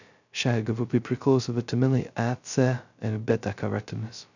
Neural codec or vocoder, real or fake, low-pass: codec, 16 kHz, 0.2 kbps, FocalCodec; fake; 7.2 kHz